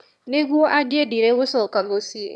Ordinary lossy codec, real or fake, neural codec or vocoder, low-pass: none; fake; autoencoder, 22.05 kHz, a latent of 192 numbers a frame, VITS, trained on one speaker; none